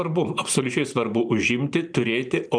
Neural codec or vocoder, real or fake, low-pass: vocoder, 44.1 kHz, 128 mel bands every 512 samples, BigVGAN v2; fake; 9.9 kHz